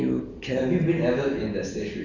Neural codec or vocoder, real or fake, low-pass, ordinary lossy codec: none; real; 7.2 kHz; none